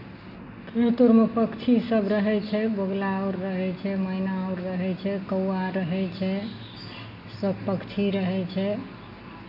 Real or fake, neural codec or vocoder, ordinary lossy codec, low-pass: real; none; none; 5.4 kHz